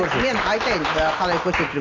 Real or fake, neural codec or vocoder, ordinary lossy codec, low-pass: real; none; AAC, 32 kbps; 7.2 kHz